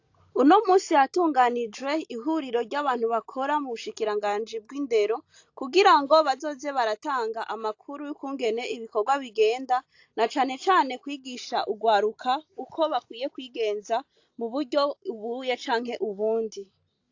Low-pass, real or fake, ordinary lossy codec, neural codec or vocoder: 7.2 kHz; real; AAC, 48 kbps; none